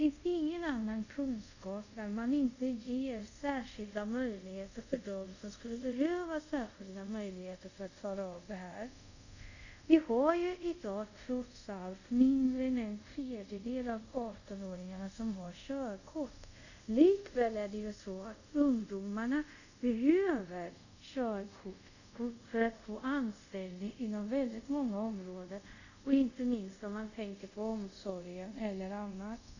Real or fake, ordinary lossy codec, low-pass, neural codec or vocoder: fake; none; 7.2 kHz; codec, 24 kHz, 0.5 kbps, DualCodec